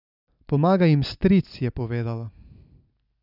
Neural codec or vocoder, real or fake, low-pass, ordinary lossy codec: none; real; 5.4 kHz; none